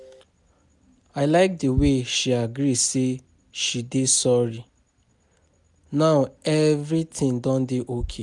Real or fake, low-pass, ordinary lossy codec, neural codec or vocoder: real; 10.8 kHz; none; none